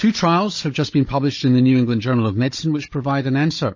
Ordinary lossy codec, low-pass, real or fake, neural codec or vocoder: MP3, 32 kbps; 7.2 kHz; fake; codec, 16 kHz, 16 kbps, FunCodec, trained on Chinese and English, 50 frames a second